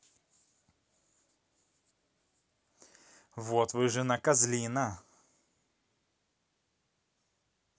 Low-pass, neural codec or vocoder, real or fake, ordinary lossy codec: none; none; real; none